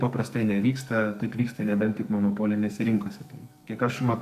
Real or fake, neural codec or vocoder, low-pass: fake; codec, 44.1 kHz, 2.6 kbps, SNAC; 14.4 kHz